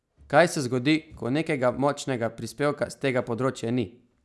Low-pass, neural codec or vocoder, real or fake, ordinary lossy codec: none; none; real; none